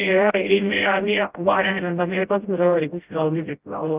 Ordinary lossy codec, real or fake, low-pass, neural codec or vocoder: Opus, 24 kbps; fake; 3.6 kHz; codec, 16 kHz, 0.5 kbps, FreqCodec, smaller model